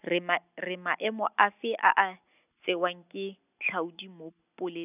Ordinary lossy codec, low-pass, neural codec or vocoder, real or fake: none; 3.6 kHz; none; real